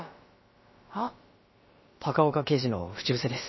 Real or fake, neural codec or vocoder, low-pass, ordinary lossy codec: fake; codec, 16 kHz, about 1 kbps, DyCAST, with the encoder's durations; 7.2 kHz; MP3, 24 kbps